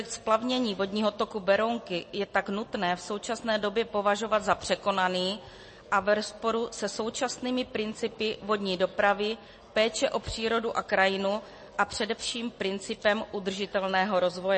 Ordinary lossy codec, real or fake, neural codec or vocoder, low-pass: MP3, 32 kbps; real; none; 10.8 kHz